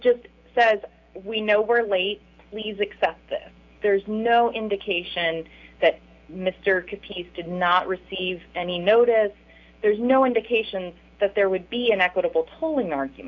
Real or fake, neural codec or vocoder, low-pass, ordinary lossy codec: real; none; 7.2 kHz; AAC, 48 kbps